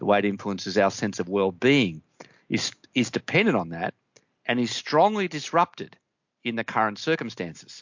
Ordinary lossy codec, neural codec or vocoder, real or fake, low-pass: MP3, 48 kbps; none; real; 7.2 kHz